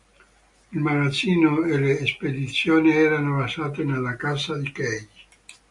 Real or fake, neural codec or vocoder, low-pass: real; none; 10.8 kHz